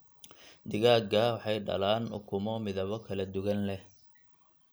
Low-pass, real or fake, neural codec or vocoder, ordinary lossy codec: none; real; none; none